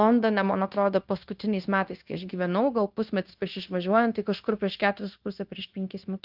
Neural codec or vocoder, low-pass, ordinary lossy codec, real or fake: codec, 16 kHz, 0.9 kbps, LongCat-Audio-Codec; 5.4 kHz; Opus, 32 kbps; fake